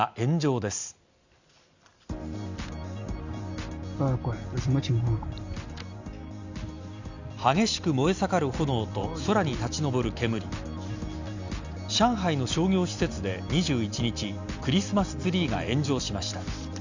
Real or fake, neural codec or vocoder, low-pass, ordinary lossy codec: real; none; 7.2 kHz; Opus, 64 kbps